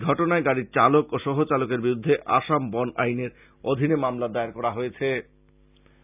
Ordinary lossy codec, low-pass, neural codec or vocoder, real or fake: none; 3.6 kHz; none; real